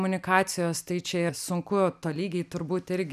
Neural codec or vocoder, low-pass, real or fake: none; 14.4 kHz; real